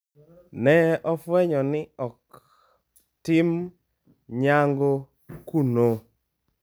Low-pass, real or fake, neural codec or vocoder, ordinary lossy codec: none; real; none; none